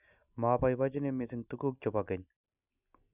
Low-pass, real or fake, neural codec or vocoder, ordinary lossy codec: 3.6 kHz; real; none; none